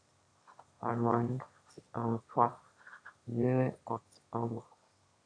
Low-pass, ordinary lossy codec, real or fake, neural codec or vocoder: 9.9 kHz; AAC, 48 kbps; fake; autoencoder, 22.05 kHz, a latent of 192 numbers a frame, VITS, trained on one speaker